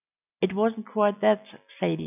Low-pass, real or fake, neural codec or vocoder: 3.6 kHz; real; none